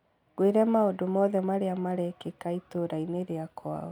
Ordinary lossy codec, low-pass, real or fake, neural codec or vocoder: none; 19.8 kHz; real; none